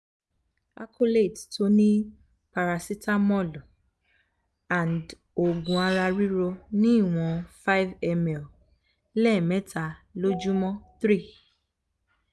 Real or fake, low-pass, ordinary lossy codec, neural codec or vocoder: real; none; none; none